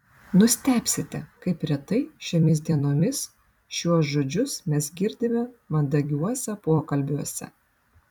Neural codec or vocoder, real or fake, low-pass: vocoder, 44.1 kHz, 128 mel bands every 256 samples, BigVGAN v2; fake; 19.8 kHz